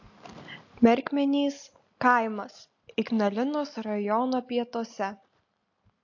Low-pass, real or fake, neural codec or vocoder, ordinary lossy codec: 7.2 kHz; real; none; AAC, 48 kbps